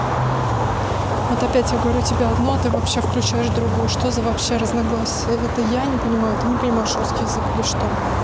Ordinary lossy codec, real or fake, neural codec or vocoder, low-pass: none; real; none; none